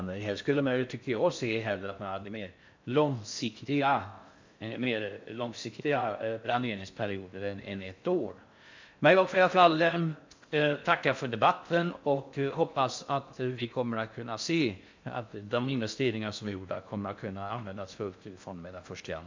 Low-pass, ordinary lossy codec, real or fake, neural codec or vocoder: 7.2 kHz; MP3, 64 kbps; fake; codec, 16 kHz in and 24 kHz out, 0.6 kbps, FocalCodec, streaming, 4096 codes